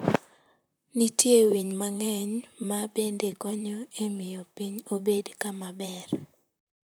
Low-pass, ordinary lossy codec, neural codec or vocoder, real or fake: none; none; vocoder, 44.1 kHz, 128 mel bands, Pupu-Vocoder; fake